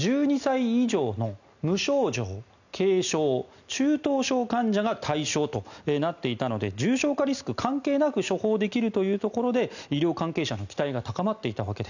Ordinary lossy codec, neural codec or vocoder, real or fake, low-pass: none; none; real; 7.2 kHz